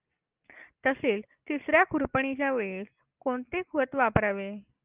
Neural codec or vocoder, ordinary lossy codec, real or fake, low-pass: none; Opus, 24 kbps; real; 3.6 kHz